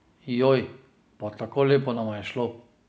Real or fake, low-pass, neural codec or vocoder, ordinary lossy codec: real; none; none; none